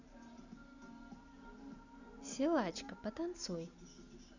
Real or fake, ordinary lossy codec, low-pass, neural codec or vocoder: real; none; 7.2 kHz; none